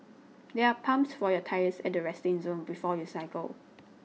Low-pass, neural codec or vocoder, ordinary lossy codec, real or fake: none; none; none; real